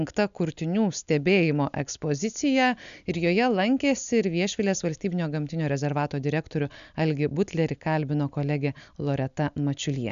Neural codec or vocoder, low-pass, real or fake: none; 7.2 kHz; real